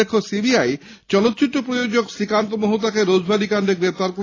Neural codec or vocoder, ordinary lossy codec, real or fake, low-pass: none; AAC, 32 kbps; real; 7.2 kHz